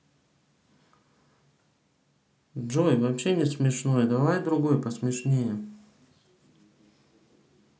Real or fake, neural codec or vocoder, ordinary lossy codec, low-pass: real; none; none; none